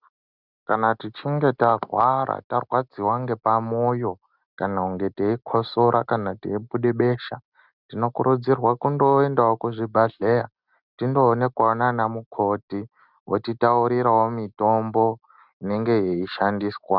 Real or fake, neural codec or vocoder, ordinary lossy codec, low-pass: real; none; Opus, 64 kbps; 5.4 kHz